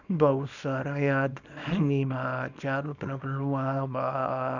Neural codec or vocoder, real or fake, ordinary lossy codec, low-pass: codec, 24 kHz, 0.9 kbps, WavTokenizer, small release; fake; none; 7.2 kHz